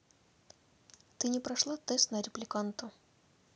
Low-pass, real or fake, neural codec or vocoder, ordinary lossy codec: none; real; none; none